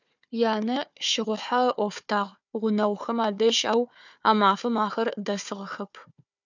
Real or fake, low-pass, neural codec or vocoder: fake; 7.2 kHz; codec, 16 kHz, 4 kbps, FunCodec, trained on Chinese and English, 50 frames a second